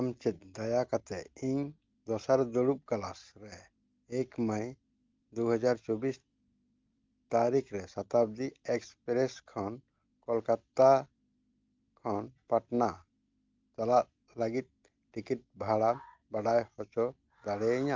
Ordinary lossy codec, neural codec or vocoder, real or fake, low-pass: Opus, 24 kbps; none; real; 7.2 kHz